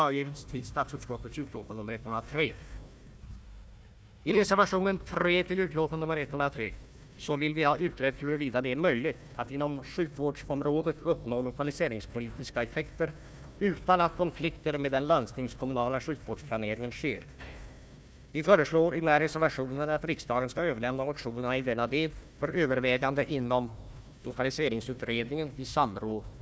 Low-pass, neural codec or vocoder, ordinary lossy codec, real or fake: none; codec, 16 kHz, 1 kbps, FunCodec, trained on Chinese and English, 50 frames a second; none; fake